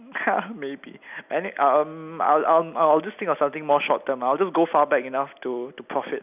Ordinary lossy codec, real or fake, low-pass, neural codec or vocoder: none; real; 3.6 kHz; none